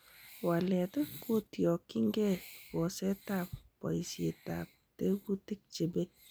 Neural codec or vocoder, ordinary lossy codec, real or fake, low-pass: none; none; real; none